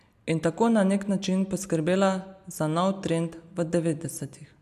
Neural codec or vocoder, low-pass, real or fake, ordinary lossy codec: none; 14.4 kHz; real; none